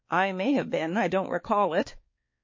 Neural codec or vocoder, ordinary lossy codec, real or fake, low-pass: codec, 16 kHz, 4 kbps, X-Codec, HuBERT features, trained on balanced general audio; MP3, 32 kbps; fake; 7.2 kHz